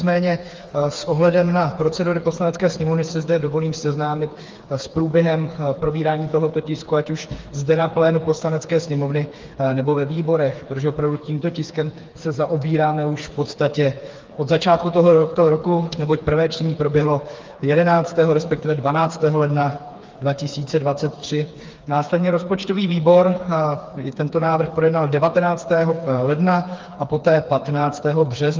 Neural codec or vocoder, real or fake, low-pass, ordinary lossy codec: codec, 16 kHz, 4 kbps, FreqCodec, smaller model; fake; 7.2 kHz; Opus, 32 kbps